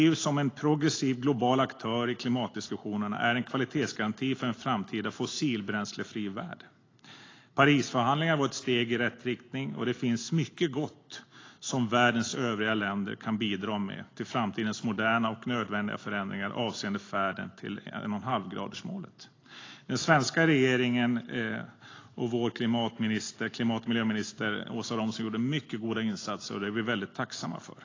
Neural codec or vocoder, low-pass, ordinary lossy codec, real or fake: none; 7.2 kHz; AAC, 32 kbps; real